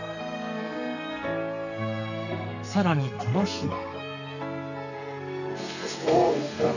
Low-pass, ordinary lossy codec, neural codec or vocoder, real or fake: 7.2 kHz; none; codec, 32 kHz, 1.9 kbps, SNAC; fake